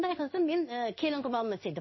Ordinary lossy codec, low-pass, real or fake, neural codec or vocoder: MP3, 24 kbps; 7.2 kHz; fake; codec, 16 kHz in and 24 kHz out, 1 kbps, XY-Tokenizer